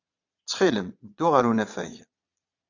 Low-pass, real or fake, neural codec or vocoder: 7.2 kHz; fake; vocoder, 22.05 kHz, 80 mel bands, Vocos